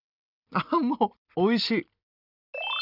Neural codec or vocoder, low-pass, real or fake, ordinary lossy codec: none; 5.4 kHz; real; none